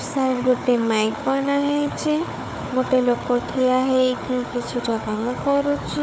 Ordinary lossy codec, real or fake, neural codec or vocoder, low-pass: none; fake; codec, 16 kHz, 4 kbps, FunCodec, trained on Chinese and English, 50 frames a second; none